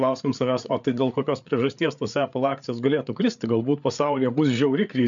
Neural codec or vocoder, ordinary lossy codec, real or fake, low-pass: codec, 16 kHz, 8 kbps, FreqCodec, larger model; MP3, 64 kbps; fake; 7.2 kHz